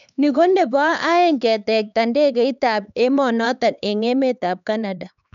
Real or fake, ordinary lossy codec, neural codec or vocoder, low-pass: fake; none; codec, 16 kHz, 4 kbps, X-Codec, HuBERT features, trained on LibriSpeech; 7.2 kHz